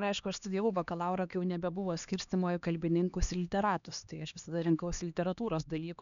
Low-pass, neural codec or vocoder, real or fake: 7.2 kHz; codec, 16 kHz, 2 kbps, X-Codec, HuBERT features, trained on LibriSpeech; fake